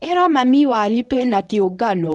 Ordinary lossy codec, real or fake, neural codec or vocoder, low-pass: none; fake; codec, 24 kHz, 0.9 kbps, WavTokenizer, medium speech release version 1; 10.8 kHz